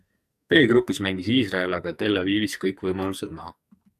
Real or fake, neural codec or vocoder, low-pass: fake; codec, 44.1 kHz, 2.6 kbps, SNAC; 14.4 kHz